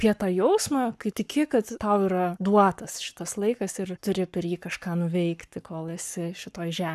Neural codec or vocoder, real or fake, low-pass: codec, 44.1 kHz, 7.8 kbps, Pupu-Codec; fake; 14.4 kHz